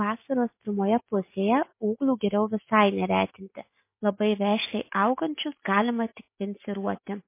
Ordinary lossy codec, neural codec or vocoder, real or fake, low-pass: MP3, 24 kbps; none; real; 3.6 kHz